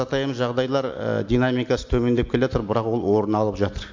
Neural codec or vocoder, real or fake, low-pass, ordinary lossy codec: none; real; 7.2 kHz; MP3, 48 kbps